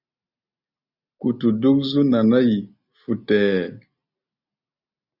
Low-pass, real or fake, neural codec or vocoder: 5.4 kHz; real; none